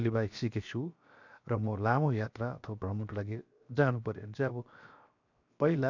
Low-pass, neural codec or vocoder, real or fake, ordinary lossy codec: 7.2 kHz; codec, 16 kHz, 0.7 kbps, FocalCodec; fake; none